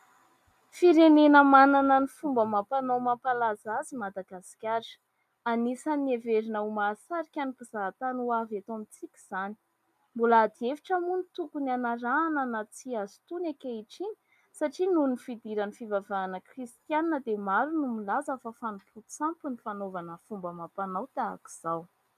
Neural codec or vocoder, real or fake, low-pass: none; real; 14.4 kHz